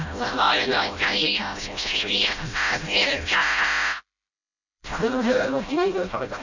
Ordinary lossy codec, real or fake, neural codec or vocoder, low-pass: none; fake; codec, 16 kHz, 0.5 kbps, FreqCodec, smaller model; 7.2 kHz